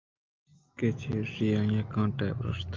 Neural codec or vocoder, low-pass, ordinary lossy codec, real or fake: none; 7.2 kHz; Opus, 24 kbps; real